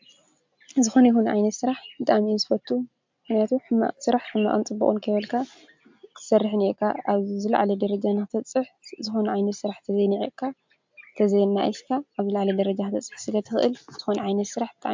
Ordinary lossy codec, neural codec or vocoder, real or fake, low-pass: MP3, 64 kbps; none; real; 7.2 kHz